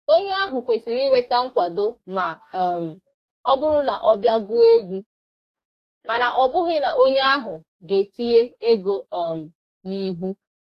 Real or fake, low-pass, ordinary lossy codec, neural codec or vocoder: fake; 14.4 kHz; AAC, 64 kbps; codec, 44.1 kHz, 2.6 kbps, DAC